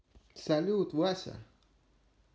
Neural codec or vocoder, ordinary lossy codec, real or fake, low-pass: none; none; real; none